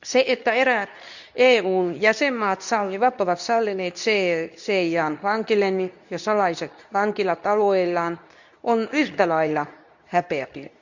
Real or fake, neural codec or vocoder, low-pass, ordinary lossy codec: fake; codec, 24 kHz, 0.9 kbps, WavTokenizer, medium speech release version 2; 7.2 kHz; none